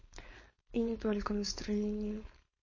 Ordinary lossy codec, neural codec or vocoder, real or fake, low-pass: MP3, 32 kbps; codec, 16 kHz, 4.8 kbps, FACodec; fake; 7.2 kHz